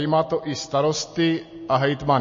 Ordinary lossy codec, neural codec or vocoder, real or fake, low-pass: MP3, 32 kbps; none; real; 7.2 kHz